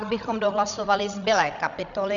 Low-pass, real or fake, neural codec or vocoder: 7.2 kHz; fake; codec, 16 kHz, 16 kbps, FreqCodec, larger model